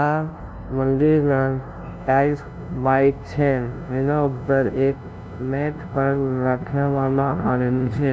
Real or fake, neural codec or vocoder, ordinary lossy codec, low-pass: fake; codec, 16 kHz, 0.5 kbps, FunCodec, trained on LibriTTS, 25 frames a second; none; none